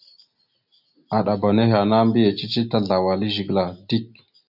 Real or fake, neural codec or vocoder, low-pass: real; none; 5.4 kHz